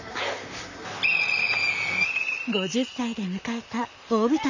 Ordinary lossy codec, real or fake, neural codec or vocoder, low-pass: none; fake; vocoder, 44.1 kHz, 128 mel bands, Pupu-Vocoder; 7.2 kHz